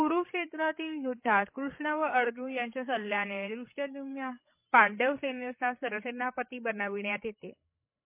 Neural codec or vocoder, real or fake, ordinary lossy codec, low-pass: codec, 16 kHz, 16 kbps, FreqCodec, larger model; fake; MP3, 24 kbps; 3.6 kHz